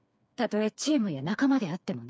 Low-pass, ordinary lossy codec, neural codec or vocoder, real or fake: none; none; codec, 16 kHz, 4 kbps, FreqCodec, smaller model; fake